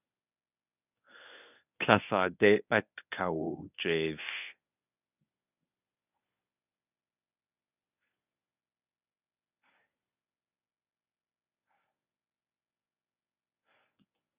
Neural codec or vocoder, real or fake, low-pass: codec, 24 kHz, 0.9 kbps, WavTokenizer, medium speech release version 1; fake; 3.6 kHz